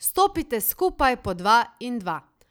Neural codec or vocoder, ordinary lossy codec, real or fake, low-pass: none; none; real; none